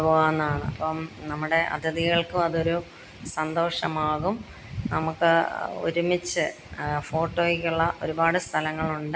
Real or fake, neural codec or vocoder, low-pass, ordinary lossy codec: real; none; none; none